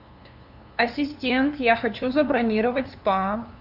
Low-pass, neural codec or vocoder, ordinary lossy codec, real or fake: 5.4 kHz; codec, 16 kHz, 2 kbps, FunCodec, trained on LibriTTS, 25 frames a second; AAC, 48 kbps; fake